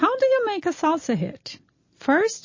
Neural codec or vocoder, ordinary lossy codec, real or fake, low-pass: none; MP3, 32 kbps; real; 7.2 kHz